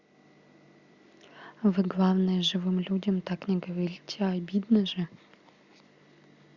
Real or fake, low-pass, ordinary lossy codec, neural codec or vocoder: real; 7.2 kHz; Opus, 64 kbps; none